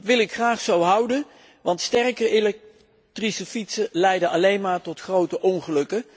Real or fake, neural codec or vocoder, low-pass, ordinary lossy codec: real; none; none; none